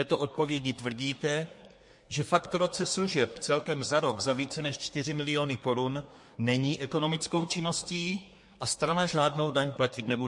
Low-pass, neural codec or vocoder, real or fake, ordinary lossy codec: 10.8 kHz; codec, 24 kHz, 1 kbps, SNAC; fake; MP3, 48 kbps